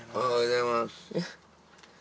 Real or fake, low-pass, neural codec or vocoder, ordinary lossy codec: real; none; none; none